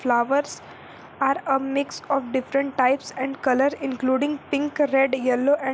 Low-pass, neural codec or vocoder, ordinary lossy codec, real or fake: none; none; none; real